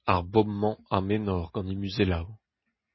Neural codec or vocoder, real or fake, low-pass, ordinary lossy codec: none; real; 7.2 kHz; MP3, 24 kbps